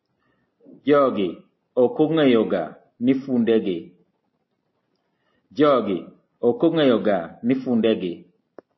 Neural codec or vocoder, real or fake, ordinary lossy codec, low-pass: none; real; MP3, 24 kbps; 7.2 kHz